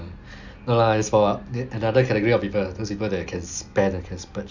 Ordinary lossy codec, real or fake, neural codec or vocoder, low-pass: none; real; none; 7.2 kHz